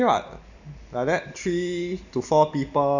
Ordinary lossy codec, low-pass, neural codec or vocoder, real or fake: none; 7.2 kHz; none; real